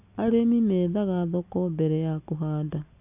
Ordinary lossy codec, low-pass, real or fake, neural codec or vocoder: AAC, 32 kbps; 3.6 kHz; real; none